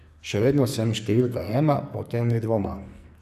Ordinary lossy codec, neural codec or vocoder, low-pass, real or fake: none; codec, 44.1 kHz, 2.6 kbps, SNAC; 14.4 kHz; fake